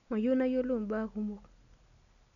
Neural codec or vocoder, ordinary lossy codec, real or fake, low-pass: none; none; real; 7.2 kHz